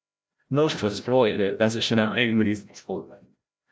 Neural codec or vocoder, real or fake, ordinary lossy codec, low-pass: codec, 16 kHz, 0.5 kbps, FreqCodec, larger model; fake; none; none